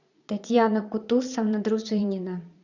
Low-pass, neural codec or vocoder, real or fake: 7.2 kHz; vocoder, 22.05 kHz, 80 mel bands, Vocos; fake